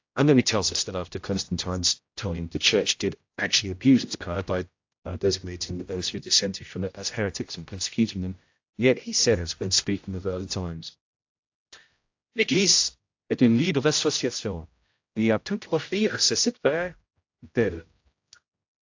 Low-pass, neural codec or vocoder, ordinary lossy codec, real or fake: 7.2 kHz; codec, 16 kHz, 0.5 kbps, X-Codec, HuBERT features, trained on general audio; AAC, 48 kbps; fake